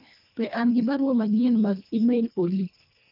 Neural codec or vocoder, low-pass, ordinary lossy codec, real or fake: codec, 24 kHz, 1.5 kbps, HILCodec; 5.4 kHz; none; fake